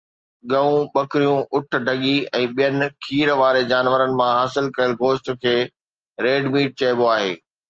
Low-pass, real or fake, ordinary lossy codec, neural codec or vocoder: 7.2 kHz; real; Opus, 24 kbps; none